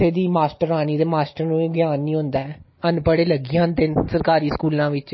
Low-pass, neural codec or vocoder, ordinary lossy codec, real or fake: 7.2 kHz; none; MP3, 24 kbps; real